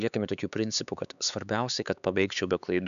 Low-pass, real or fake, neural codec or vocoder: 7.2 kHz; fake; codec, 16 kHz, 4 kbps, X-Codec, HuBERT features, trained on LibriSpeech